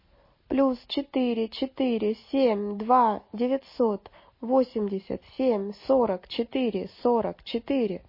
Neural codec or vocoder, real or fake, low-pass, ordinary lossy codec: none; real; 5.4 kHz; MP3, 24 kbps